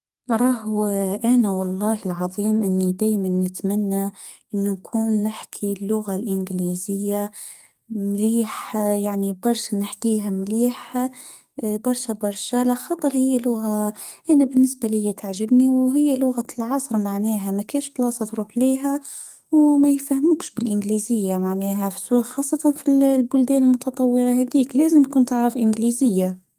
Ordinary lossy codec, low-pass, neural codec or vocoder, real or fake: Opus, 32 kbps; 14.4 kHz; codec, 44.1 kHz, 2.6 kbps, SNAC; fake